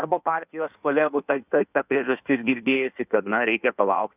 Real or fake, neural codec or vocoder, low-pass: fake; codec, 16 kHz in and 24 kHz out, 1.1 kbps, FireRedTTS-2 codec; 3.6 kHz